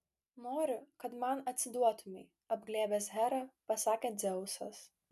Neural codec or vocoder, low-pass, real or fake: none; 14.4 kHz; real